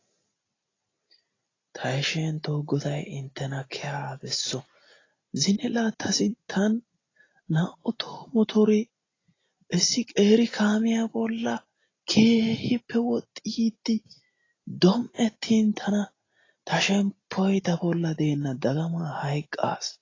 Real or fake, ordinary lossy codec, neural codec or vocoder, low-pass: real; AAC, 32 kbps; none; 7.2 kHz